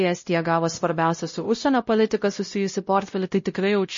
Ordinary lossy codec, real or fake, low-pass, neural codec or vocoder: MP3, 32 kbps; fake; 7.2 kHz; codec, 16 kHz, 0.5 kbps, X-Codec, WavLM features, trained on Multilingual LibriSpeech